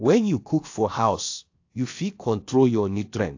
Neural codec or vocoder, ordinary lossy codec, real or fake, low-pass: codec, 16 kHz, about 1 kbps, DyCAST, with the encoder's durations; AAC, 48 kbps; fake; 7.2 kHz